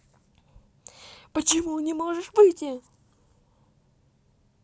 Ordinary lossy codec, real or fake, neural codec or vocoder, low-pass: none; real; none; none